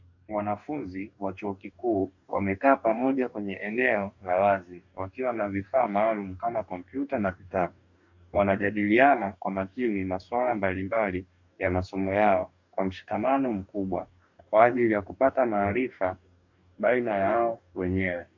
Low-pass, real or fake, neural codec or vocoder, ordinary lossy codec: 7.2 kHz; fake; codec, 44.1 kHz, 2.6 kbps, DAC; MP3, 48 kbps